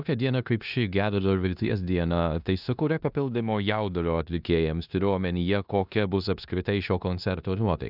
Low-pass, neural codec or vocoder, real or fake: 5.4 kHz; codec, 16 kHz in and 24 kHz out, 0.9 kbps, LongCat-Audio-Codec, four codebook decoder; fake